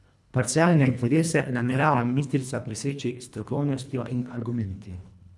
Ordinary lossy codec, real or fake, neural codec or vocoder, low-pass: none; fake; codec, 24 kHz, 1.5 kbps, HILCodec; none